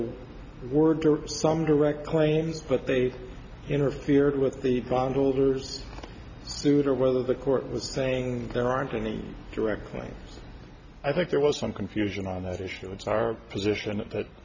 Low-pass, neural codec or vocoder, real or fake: 7.2 kHz; none; real